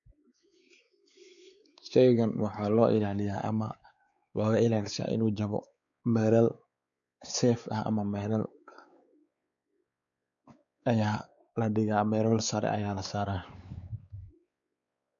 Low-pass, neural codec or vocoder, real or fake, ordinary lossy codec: 7.2 kHz; codec, 16 kHz, 4 kbps, X-Codec, WavLM features, trained on Multilingual LibriSpeech; fake; none